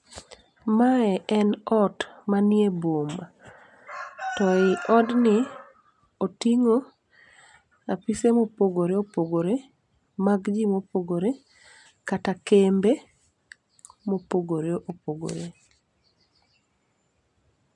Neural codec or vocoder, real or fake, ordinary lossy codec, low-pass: none; real; none; 10.8 kHz